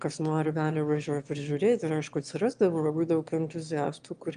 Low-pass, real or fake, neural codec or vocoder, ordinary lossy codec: 9.9 kHz; fake; autoencoder, 22.05 kHz, a latent of 192 numbers a frame, VITS, trained on one speaker; Opus, 32 kbps